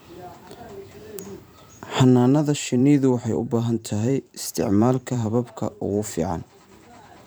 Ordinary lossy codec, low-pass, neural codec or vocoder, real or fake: none; none; vocoder, 44.1 kHz, 128 mel bands every 512 samples, BigVGAN v2; fake